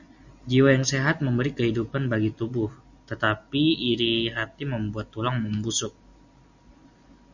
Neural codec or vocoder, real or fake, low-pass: none; real; 7.2 kHz